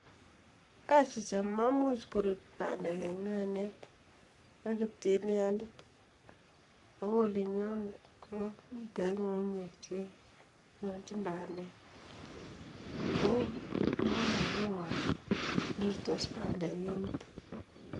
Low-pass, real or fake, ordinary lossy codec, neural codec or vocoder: 10.8 kHz; fake; none; codec, 44.1 kHz, 3.4 kbps, Pupu-Codec